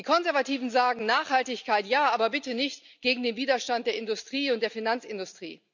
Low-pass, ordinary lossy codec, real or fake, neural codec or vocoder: 7.2 kHz; none; real; none